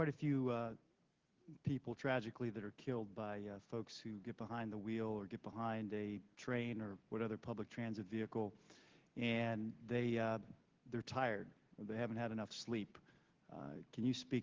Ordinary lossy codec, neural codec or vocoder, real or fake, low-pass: Opus, 16 kbps; none; real; 7.2 kHz